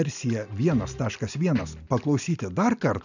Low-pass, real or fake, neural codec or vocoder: 7.2 kHz; real; none